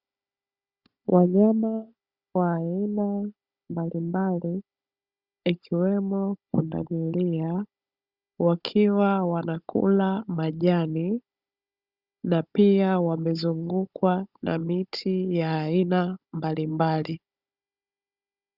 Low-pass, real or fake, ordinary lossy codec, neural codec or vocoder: 5.4 kHz; fake; Opus, 64 kbps; codec, 16 kHz, 16 kbps, FunCodec, trained on Chinese and English, 50 frames a second